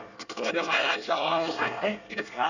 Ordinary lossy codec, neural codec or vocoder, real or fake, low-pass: none; codec, 24 kHz, 1 kbps, SNAC; fake; 7.2 kHz